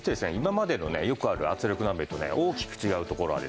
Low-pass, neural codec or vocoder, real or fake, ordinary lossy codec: none; none; real; none